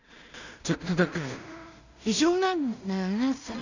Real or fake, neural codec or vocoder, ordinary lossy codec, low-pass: fake; codec, 16 kHz in and 24 kHz out, 0.4 kbps, LongCat-Audio-Codec, two codebook decoder; none; 7.2 kHz